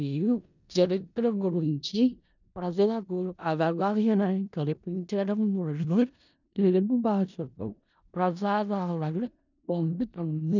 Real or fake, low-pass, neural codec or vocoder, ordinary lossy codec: fake; 7.2 kHz; codec, 16 kHz in and 24 kHz out, 0.4 kbps, LongCat-Audio-Codec, four codebook decoder; none